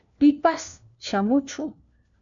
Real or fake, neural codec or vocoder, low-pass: fake; codec, 16 kHz, 1 kbps, FunCodec, trained on LibriTTS, 50 frames a second; 7.2 kHz